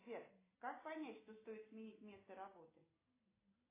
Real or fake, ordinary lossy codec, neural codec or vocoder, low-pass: real; AAC, 16 kbps; none; 3.6 kHz